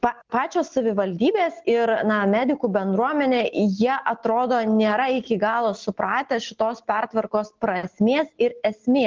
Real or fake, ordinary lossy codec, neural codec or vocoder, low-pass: real; Opus, 16 kbps; none; 7.2 kHz